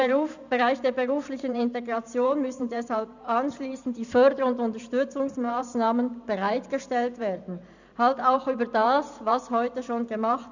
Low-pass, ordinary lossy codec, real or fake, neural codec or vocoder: 7.2 kHz; none; fake; vocoder, 44.1 kHz, 128 mel bands every 512 samples, BigVGAN v2